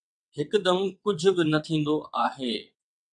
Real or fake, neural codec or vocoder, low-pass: fake; vocoder, 22.05 kHz, 80 mel bands, WaveNeXt; 9.9 kHz